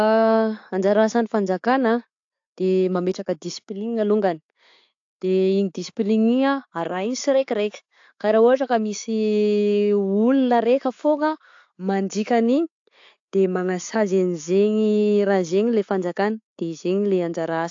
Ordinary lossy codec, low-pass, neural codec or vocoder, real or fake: AAC, 48 kbps; 7.2 kHz; none; real